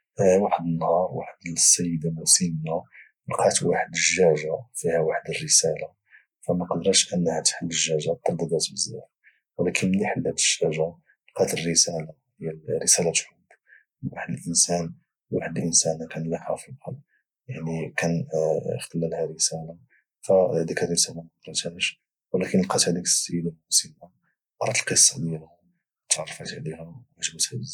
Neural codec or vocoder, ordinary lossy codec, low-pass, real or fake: none; none; 19.8 kHz; real